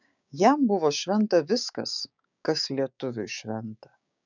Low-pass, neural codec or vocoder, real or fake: 7.2 kHz; codec, 16 kHz, 6 kbps, DAC; fake